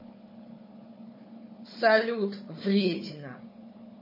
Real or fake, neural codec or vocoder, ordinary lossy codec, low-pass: fake; codec, 16 kHz, 4 kbps, FunCodec, trained on Chinese and English, 50 frames a second; MP3, 24 kbps; 5.4 kHz